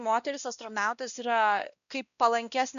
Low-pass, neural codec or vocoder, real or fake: 7.2 kHz; codec, 16 kHz, 1 kbps, X-Codec, WavLM features, trained on Multilingual LibriSpeech; fake